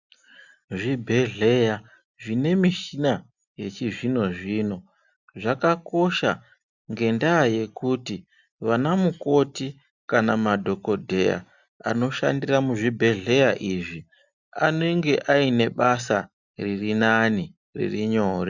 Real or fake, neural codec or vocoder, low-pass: real; none; 7.2 kHz